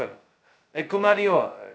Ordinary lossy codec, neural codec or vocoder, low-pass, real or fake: none; codec, 16 kHz, 0.2 kbps, FocalCodec; none; fake